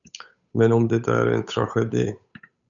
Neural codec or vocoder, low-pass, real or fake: codec, 16 kHz, 8 kbps, FunCodec, trained on Chinese and English, 25 frames a second; 7.2 kHz; fake